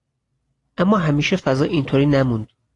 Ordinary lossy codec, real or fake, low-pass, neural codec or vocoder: AAC, 48 kbps; real; 10.8 kHz; none